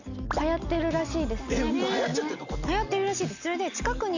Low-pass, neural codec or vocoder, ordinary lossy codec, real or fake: 7.2 kHz; none; none; real